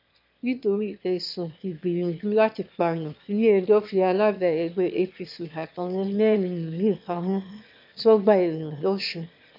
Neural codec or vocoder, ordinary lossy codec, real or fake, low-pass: autoencoder, 22.05 kHz, a latent of 192 numbers a frame, VITS, trained on one speaker; MP3, 48 kbps; fake; 5.4 kHz